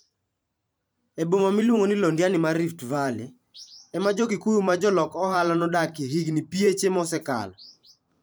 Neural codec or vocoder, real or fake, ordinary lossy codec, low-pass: vocoder, 44.1 kHz, 128 mel bands every 512 samples, BigVGAN v2; fake; none; none